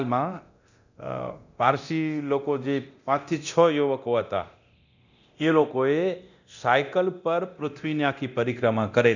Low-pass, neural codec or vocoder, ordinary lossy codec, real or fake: 7.2 kHz; codec, 24 kHz, 0.9 kbps, DualCodec; none; fake